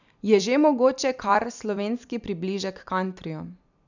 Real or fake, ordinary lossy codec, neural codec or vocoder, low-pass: fake; none; vocoder, 44.1 kHz, 128 mel bands every 256 samples, BigVGAN v2; 7.2 kHz